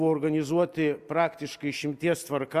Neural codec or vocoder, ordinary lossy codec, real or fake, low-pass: none; Opus, 64 kbps; real; 14.4 kHz